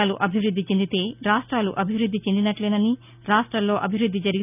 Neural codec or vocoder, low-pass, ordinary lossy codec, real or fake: none; 3.6 kHz; none; real